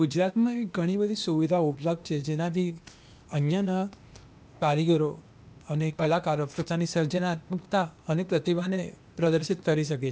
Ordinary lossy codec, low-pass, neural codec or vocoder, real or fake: none; none; codec, 16 kHz, 0.8 kbps, ZipCodec; fake